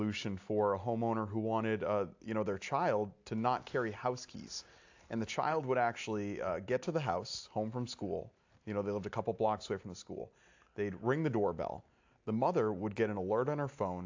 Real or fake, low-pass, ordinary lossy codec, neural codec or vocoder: real; 7.2 kHz; AAC, 48 kbps; none